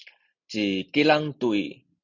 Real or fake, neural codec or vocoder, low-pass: real; none; 7.2 kHz